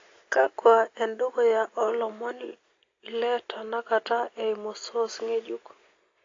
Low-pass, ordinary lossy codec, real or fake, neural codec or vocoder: 7.2 kHz; AAC, 32 kbps; real; none